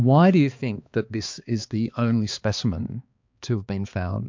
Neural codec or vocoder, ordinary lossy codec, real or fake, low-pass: codec, 16 kHz, 2 kbps, X-Codec, HuBERT features, trained on balanced general audio; MP3, 64 kbps; fake; 7.2 kHz